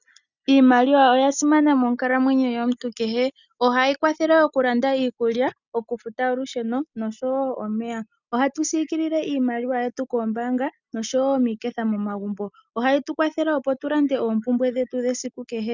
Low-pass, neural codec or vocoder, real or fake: 7.2 kHz; none; real